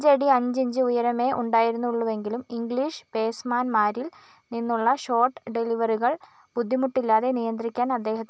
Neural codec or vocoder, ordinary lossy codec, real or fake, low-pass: none; none; real; none